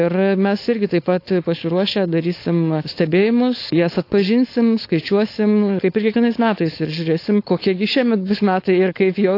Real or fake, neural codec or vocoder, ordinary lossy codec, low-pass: fake; codec, 16 kHz, 4.8 kbps, FACodec; AAC, 32 kbps; 5.4 kHz